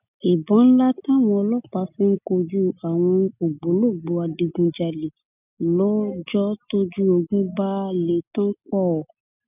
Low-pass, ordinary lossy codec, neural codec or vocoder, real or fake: 3.6 kHz; none; none; real